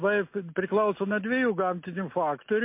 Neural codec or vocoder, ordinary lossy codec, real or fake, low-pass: none; MP3, 24 kbps; real; 3.6 kHz